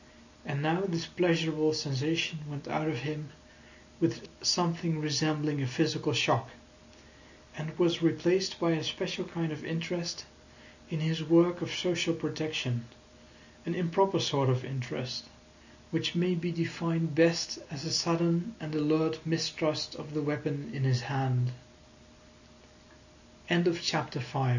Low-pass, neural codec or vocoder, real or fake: 7.2 kHz; none; real